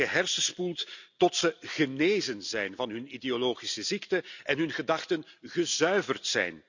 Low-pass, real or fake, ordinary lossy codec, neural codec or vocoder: 7.2 kHz; real; none; none